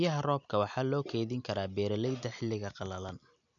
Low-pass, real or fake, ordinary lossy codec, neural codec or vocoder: 7.2 kHz; real; none; none